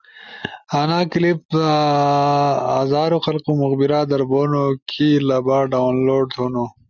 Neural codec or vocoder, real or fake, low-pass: none; real; 7.2 kHz